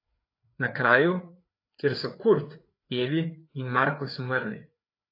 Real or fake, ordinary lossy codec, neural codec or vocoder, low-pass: fake; AAC, 32 kbps; codec, 16 kHz, 4 kbps, FreqCodec, larger model; 5.4 kHz